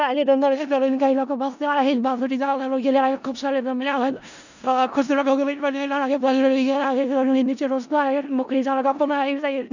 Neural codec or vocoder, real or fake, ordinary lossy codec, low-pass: codec, 16 kHz in and 24 kHz out, 0.4 kbps, LongCat-Audio-Codec, four codebook decoder; fake; none; 7.2 kHz